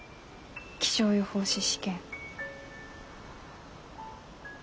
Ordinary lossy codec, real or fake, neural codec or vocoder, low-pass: none; real; none; none